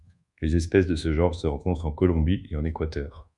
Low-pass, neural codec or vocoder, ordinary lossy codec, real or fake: 10.8 kHz; codec, 24 kHz, 1.2 kbps, DualCodec; MP3, 96 kbps; fake